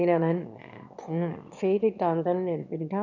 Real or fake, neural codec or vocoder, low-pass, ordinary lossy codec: fake; autoencoder, 22.05 kHz, a latent of 192 numbers a frame, VITS, trained on one speaker; 7.2 kHz; none